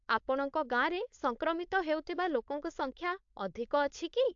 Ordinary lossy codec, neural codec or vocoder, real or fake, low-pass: AAC, 64 kbps; codec, 16 kHz, 4.8 kbps, FACodec; fake; 7.2 kHz